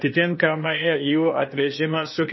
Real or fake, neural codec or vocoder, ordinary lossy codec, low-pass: fake; codec, 16 kHz, 0.8 kbps, ZipCodec; MP3, 24 kbps; 7.2 kHz